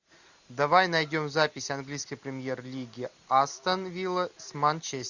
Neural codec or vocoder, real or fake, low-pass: none; real; 7.2 kHz